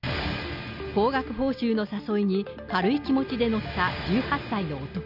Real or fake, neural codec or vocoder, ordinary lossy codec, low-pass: real; none; none; 5.4 kHz